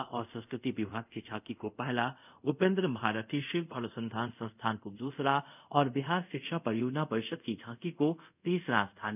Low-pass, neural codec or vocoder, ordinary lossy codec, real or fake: 3.6 kHz; codec, 24 kHz, 0.5 kbps, DualCodec; none; fake